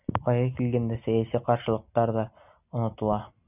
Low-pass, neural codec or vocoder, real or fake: 3.6 kHz; none; real